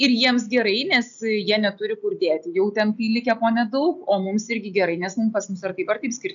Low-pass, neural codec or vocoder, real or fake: 7.2 kHz; none; real